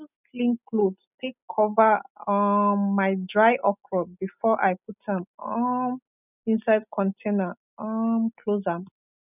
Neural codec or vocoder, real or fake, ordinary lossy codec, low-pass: none; real; none; 3.6 kHz